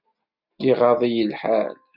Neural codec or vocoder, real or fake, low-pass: none; real; 5.4 kHz